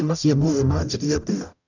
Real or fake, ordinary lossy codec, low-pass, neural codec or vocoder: fake; none; 7.2 kHz; codec, 44.1 kHz, 0.9 kbps, DAC